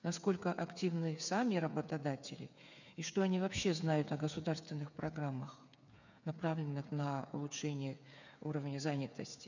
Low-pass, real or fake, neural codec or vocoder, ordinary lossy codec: 7.2 kHz; fake; codec, 16 kHz, 8 kbps, FreqCodec, smaller model; none